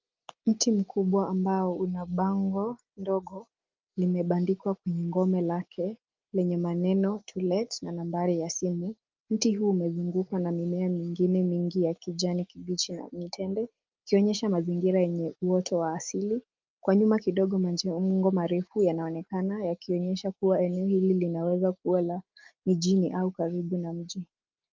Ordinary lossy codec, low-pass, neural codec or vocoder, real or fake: Opus, 24 kbps; 7.2 kHz; none; real